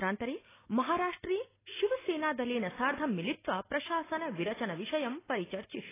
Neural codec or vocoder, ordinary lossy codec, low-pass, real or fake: none; AAC, 16 kbps; 3.6 kHz; real